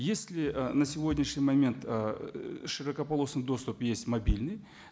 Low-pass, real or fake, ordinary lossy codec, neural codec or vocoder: none; real; none; none